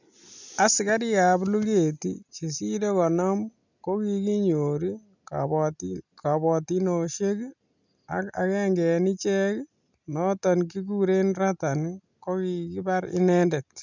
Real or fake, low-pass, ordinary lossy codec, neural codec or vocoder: real; 7.2 kHz; none; none